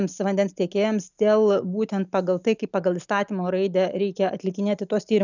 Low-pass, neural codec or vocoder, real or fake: 7.2 kHz; none; real